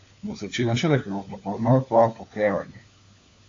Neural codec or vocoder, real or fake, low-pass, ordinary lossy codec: codec, 16 kHz, 4 kbps, FunCodec, trained on LibriTTS, 50 frames a second; fake; 7.2 kHz; AAC, 48 kbps